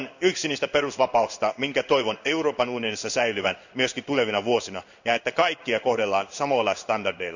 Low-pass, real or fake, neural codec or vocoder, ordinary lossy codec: 7.2 kHz; fake; codec, 16 kHz in and 24 kHz out, 1 kbps, XY-Tokenizer; none